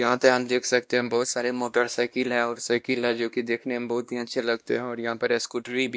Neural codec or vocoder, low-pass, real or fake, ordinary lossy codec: codec, 16 kHz, 1 kbps, X-Codec, WavLM features, trained on Multilingual LibriSpeech; none; fake; none